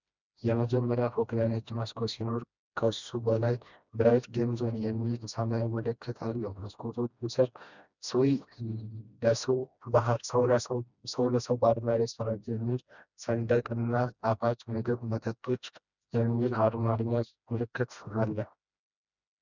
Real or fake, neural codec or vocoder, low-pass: fake; codec, 16 kHz, 1 kbps, FreqCodec, smaller model; 7.2 kHz